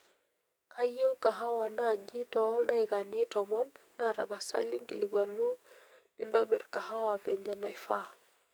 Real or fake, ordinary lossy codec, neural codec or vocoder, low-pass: fake; none; codec, 44.1 kHz, 2.6 kbps, SNAC; none